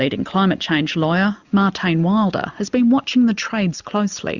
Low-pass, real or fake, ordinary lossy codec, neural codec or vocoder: 7.2 kHz; real; Opus, 64 kbps; none